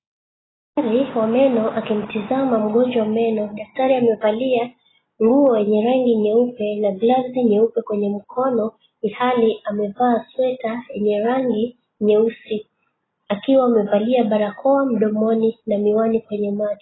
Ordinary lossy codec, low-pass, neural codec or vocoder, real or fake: AAC, 16 kbps; 7.2 kHz; none; real